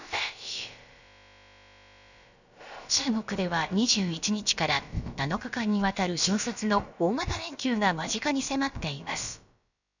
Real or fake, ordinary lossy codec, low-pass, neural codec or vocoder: fake; none; 7.2 kHz; codec, 16 kHz, about 1 kbps, DyCAST, with the encoder's durations